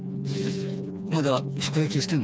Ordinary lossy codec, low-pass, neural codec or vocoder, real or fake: none; none; codec, 16 kHz, 2 kbps, FreqCodec, smaller model; fake